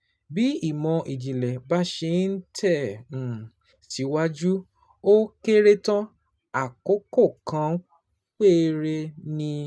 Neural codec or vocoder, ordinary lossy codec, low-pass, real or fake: none; none; none; real